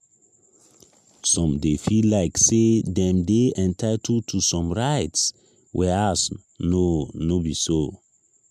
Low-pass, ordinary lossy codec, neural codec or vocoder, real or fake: 14.4 kHz; MP3, 96 kbps; none; real